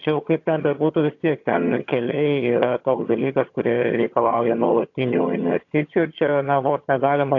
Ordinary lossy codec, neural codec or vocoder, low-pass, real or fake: AAC, 48 kbps; vocoder, 22.05 kHz, 80 mel bands, HiFi-GAN; 7.2 kHz; fake